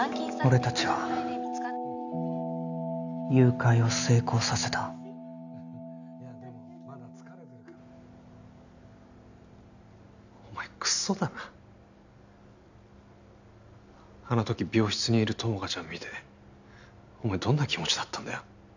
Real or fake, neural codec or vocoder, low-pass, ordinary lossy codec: real; none; 7.2 kHz; none